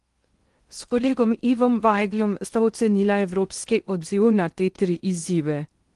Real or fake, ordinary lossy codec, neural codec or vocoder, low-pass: fake; Opus, 24 kbps; codec, 16 kHz in and 24 kHz out, 0.6 kbps, FocalCodec, streaming, 2048 codes; 10.8 kHz